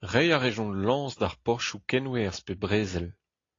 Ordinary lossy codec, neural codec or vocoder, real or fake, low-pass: AAC, 32 kbps; none; real; 7.2 kHz